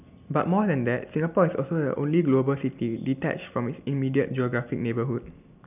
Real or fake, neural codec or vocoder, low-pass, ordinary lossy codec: real; none; 3.6 kHz; none